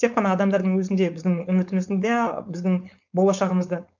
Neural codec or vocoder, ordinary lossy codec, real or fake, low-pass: codec, 16 kHz, 4.8 kbps, FACodec; none; fake; 7.2 kHz